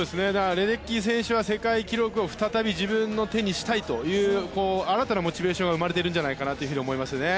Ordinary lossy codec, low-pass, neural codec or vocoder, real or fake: none; none; none; real